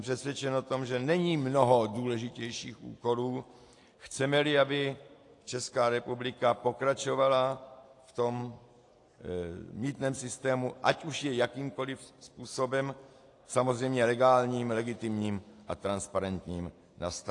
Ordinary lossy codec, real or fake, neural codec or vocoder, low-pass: AAC, 48 kbps; real; none; 10.8 kHz